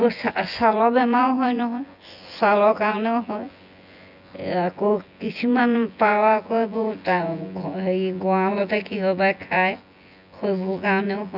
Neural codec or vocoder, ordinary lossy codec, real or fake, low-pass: vocoder, 24 kHz, 100 mel bands, Vocos; none; fake; 5.4 kHz